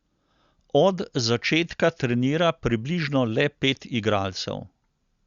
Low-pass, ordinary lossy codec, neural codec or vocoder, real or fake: 7.2 kHz; Opus, 64 kbps; none; real